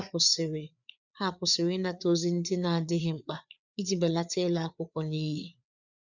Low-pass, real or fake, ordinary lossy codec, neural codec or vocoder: 7.2 kHz; fake; none; codec, 16 kHz, 4 kbps, FreqCodec, larger model